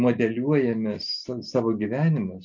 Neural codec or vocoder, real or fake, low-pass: none; real; 7.2 kHz